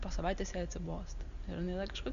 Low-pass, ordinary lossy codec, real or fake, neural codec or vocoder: 7.2 kHz; Opus, 64 kbps; real; none